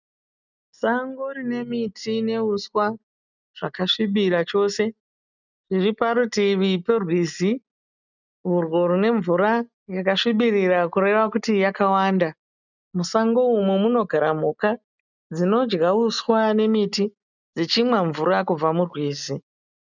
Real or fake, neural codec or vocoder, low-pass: real; none; 7.2 kHz